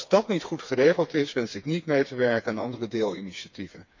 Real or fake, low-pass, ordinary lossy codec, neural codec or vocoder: fake; 7.2 kHz; MP3, 64 kbps; codec, 16 kHz, 4 kbps, FreqCodec, smaller model